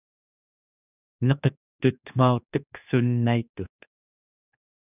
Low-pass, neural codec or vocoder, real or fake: 3.6 kHz; codec, 16 kHz, 2 kbps, X-Codec, HuBERT features, trained on general audio; fake